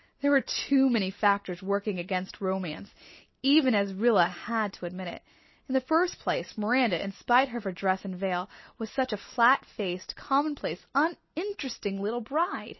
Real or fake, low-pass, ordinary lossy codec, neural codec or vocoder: real; 7.2 kHz; MP3, 24 kbps; none